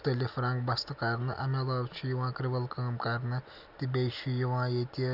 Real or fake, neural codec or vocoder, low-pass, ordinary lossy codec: real; none; 5.4 kHz; none